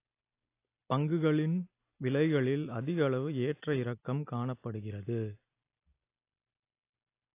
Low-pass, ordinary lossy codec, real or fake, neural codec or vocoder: 3.6 kHz; AAC, 24 kbps; real; none